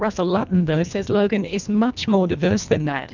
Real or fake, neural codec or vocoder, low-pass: fake; codec, 24 kHz, 1.5 kbps, HILCodec; 7.2 kHz